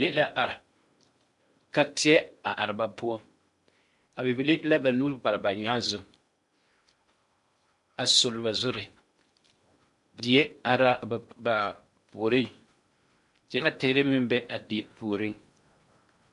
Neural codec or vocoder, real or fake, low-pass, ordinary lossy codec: codec, 16 kHz in and 24 kHz out, 0.8 kbps, FocalCodec, streaming, 65536 codes; fake; 10.8 kHz; MP3, 64 kbps